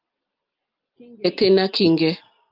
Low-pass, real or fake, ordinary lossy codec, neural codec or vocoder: 5.4 kHz; real; Opus, 32 kbps; none